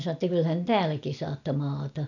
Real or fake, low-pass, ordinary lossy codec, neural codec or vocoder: real; 7.2 kHz; none; none